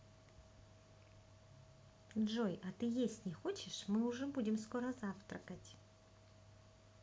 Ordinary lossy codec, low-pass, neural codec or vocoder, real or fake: none; none; none; real